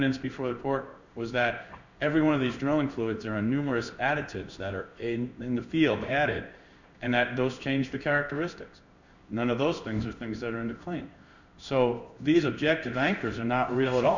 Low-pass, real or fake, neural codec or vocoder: 7.2 kHz; fake; codec, 16 kHz in and 24 kHz out, 1 kbps, XY-Tokenizer